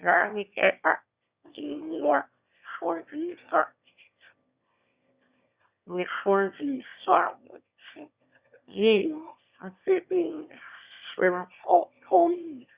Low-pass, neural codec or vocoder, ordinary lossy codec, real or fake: 3.6 kHz; autoencoder, 22.05 kHz, a latent of 192 numbers a frame, VITS, trained on one speaker; Opus, 64 kbps; fake